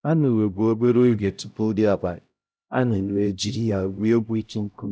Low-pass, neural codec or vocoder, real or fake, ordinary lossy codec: none; codec, 16 kHz, 0.5 kbps, X-Codec, HuBERT features, trained on LibriSpeech; fake; none